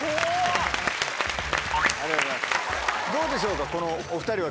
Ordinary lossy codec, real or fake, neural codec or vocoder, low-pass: none; real; none; none